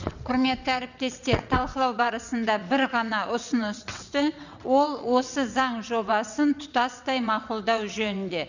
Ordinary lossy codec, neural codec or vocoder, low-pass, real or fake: none; vocoder, 22.05 kHz, 80 mel bands, WaveNeXt; 7.2 kHz; fake